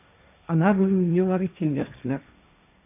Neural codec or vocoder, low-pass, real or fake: codec, 16 kHz, 1.1 kbps, Voila-Tokenizer; 3.6 kHz; fake